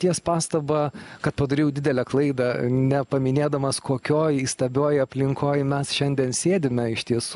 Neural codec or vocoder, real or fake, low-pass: none; real; 10.8 kHz